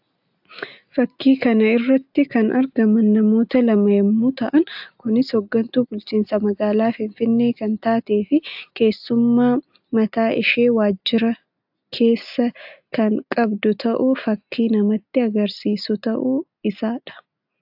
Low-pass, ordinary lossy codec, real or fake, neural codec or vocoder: 5.4 kHz; AAC, 48 kbps; real; none